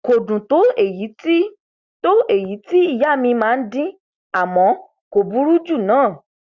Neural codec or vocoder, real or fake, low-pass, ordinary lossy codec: none; real; 7.2 kHz; Opus, 64 kbps